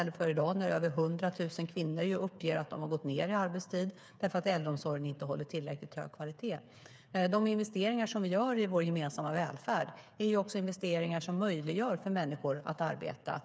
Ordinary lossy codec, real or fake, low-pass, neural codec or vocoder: none; fake; none; codec, 16 kHz, 8 kbps, FreqCodec, smaller model